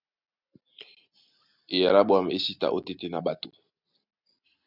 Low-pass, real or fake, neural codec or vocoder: 5.4 kHz; real; none